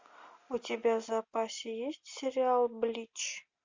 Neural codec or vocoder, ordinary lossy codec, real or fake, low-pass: none; MP3, 64 kbps; real; 7.2 kHz